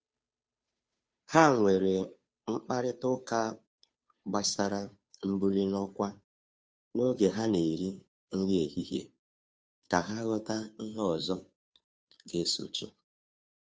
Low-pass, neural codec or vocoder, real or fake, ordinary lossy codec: none; codec, 16 kHz, 2 kbps, FunCodec, trained on Chinese and English, 25 frames a second; fake; none